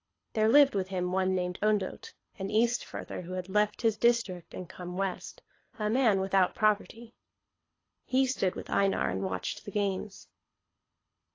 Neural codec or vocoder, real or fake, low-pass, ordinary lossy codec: codec, 24 kHz, 6 kbps, HILCodec; fake; 7.2 kHz; AAC, 32 kbps